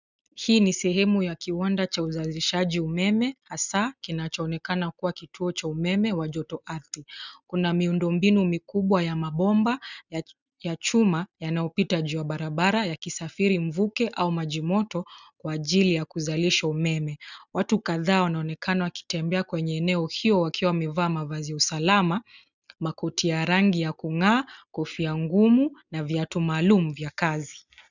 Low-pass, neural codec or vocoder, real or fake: 7.2 kHz; none; real